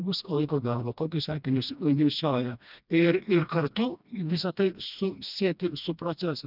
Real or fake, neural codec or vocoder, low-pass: fake; codec, 16 kHz, 1 kbps, FreqCodec, smaller model; 5.4 kHz